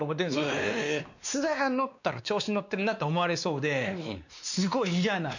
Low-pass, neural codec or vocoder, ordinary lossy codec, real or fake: 7.2 kHz; codec, 16 kHz, 2 kbps, X-Codec, WavLM features, trained on Multilingual LibriSpeech; none; fake